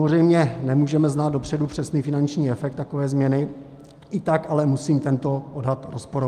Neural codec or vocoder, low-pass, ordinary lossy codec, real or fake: none; 14.4 kHz; Opus, 32 kbps; real